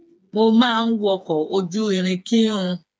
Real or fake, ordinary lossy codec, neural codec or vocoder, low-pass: fake; none; codec, 16 kHz, 4 kbps, FreqCodec, smaller model; none